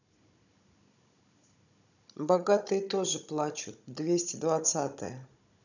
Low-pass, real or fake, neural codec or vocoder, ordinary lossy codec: 7.2 kHz; fake; codec, 16 kHz, 16 kbps, FunCodec, trained on Chinese and English, 50 frames a second; none